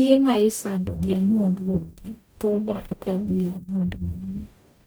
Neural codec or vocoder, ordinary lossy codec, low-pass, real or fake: codec, 44.1 kHz, 0.9 kbps, DAC; none; none; fake